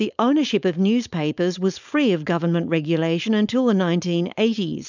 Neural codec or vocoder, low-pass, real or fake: codec, 16 kHz, 4.8 kbps, FACodec; 7.2 kHz; fake